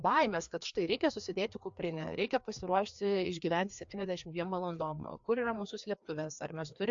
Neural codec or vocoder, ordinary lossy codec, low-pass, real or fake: codec, 16 kHz, 2 kbps, FreqCodec, larger model; AAC, 64 kbps; 7.2 kHz; fake